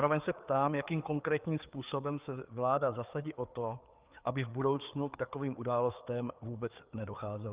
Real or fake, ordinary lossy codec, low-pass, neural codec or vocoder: fake; Opus, 32 kbps; 3.6 kHz; codec, 16 kHz, 4 kbps, FreqCodec, larger model